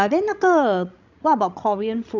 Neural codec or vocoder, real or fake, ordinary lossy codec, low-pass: codec, 16 kHz, 16 kbps, FreqCodec, larger model; fake; none; 7.2 kHz